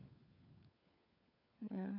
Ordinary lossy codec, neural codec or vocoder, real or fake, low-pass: none; codec, 16 kHz, 4 kbps, FunCodec, trained on LibriTTS, 50 frames a second; fake; 5.4 kHz